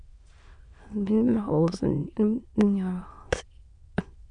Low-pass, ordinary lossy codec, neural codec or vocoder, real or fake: 9.9 kHz; Opus, 64 kbps; autoencoder, 22.05 kHz, a latent of 192 numbers a frame, VITS, trained on many speakers; fake